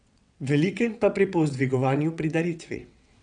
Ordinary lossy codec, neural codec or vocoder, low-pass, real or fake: none; vocoder, 22.05 kHz, 80 mel bands, WaveNeXt; 9.9 kHz; fake